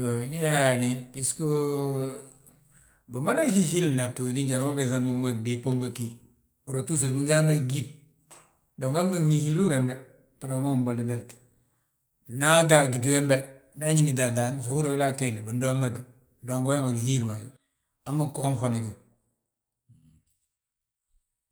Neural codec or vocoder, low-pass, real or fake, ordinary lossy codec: codec, 44.1 kHz, 2.6 kbps, SNAC; none; fake; none